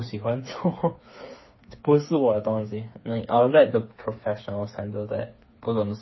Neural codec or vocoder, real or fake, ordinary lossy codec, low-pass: codec, 16 kHz, 4 kbps, FreqCodec, smaller model; fake; MP3, 24 kbps; 7.2 kHz